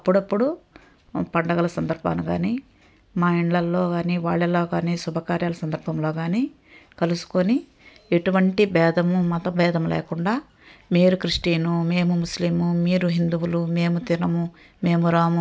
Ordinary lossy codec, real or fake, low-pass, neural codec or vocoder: none; real; none; none